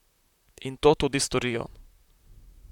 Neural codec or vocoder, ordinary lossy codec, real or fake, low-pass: vocoder, 44.1 kHz, 128 mel bands, Pupu-Vocoder; none; fake; 19.8 kHz